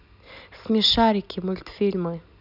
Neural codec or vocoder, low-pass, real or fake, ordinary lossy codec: none; 5.4 kHz; real; none